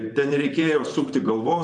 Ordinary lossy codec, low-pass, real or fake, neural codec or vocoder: MP3, 96 kbps; 10.8 kHz; fake; vocoder, 44.1 kHz, 128 mel bands, Pupu-Vocoder